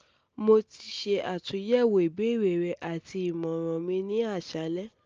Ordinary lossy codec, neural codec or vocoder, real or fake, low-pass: Opus, 24 kbps; none; real; 7.2 kHz